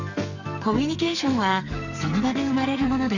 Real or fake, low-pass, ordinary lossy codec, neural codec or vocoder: fake; 7.2 kHz; none; codec, 44.1 kHz, 2.6 kbps, SNAC